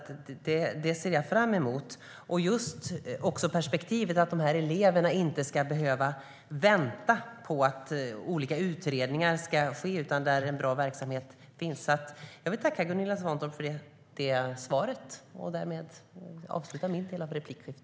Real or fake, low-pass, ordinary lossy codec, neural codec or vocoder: real; none; none; none